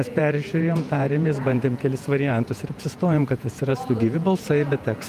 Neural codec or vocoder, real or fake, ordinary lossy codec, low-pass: vocoder, 48 kHz, 128 mel bands, Vocos; fake; Opus, 32 kbps; 14.4 kHz